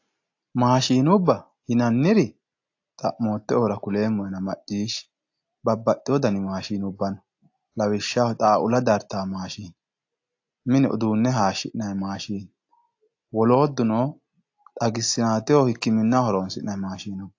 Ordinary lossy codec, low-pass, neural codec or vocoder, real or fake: AAC, 48 kbps; 7.2 kHz; none; real